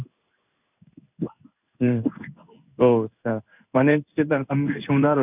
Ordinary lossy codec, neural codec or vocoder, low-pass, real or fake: none; codec, 16 kHz in and 24 kHz out, 1 kbps, XY-Tokenizer; 3.6 kHz; fake